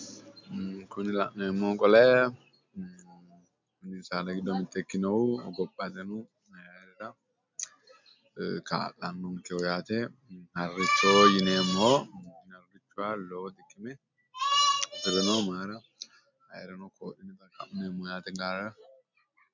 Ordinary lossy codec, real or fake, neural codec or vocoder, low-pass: MP3, 64 kbps; real; none; 7.2 kHz